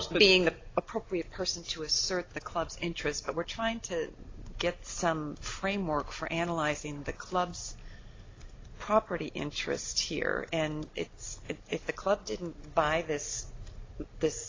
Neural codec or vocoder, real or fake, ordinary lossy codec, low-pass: none; real; AAC, 32 kbps; 7.2 kHz